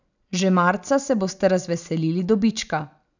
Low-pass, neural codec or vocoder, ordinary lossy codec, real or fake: 7.2 kHz; none; none; real